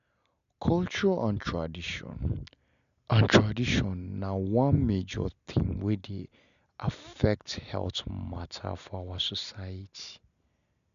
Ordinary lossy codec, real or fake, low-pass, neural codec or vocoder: MP3, 96 kbps; real; 7.2 kHz; none